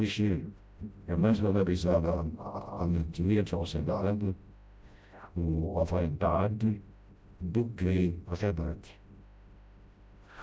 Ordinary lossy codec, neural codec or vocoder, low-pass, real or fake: none; codec, 16 kHz, 0.5 kbps, FreqCodec, smaller model; none; fake